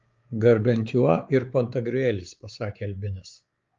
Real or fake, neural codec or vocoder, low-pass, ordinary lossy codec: fake; codec, 16 kHz, 4 kbps, X-Codec, WavLM features, trained on Multilingual LibriSpeech; 7.2 kHz; Opus, 32 kbps